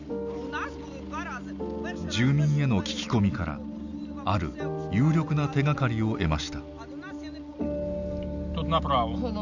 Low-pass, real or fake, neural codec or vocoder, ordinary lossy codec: 7.2 kHz; real; none; none